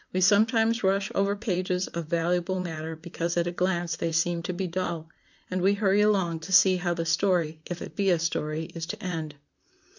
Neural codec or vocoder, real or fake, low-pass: vocoder, 44.1 kHz, 128 mel bands, Pupu-Vocoder; fake; 7.2 kHz